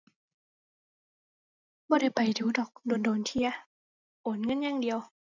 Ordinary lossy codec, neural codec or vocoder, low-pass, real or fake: none; none; 7.2 kHz; real